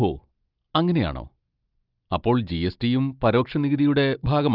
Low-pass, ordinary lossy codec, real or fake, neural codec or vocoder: 5.4 kHz; Opus, 24 kbps; real; none